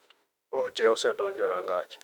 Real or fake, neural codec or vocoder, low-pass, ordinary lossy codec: fake; autoencoder, 48 kHz, 32 numbers a frame, DAC-VAE, trained on Japanese speech; 19.8 kHz; none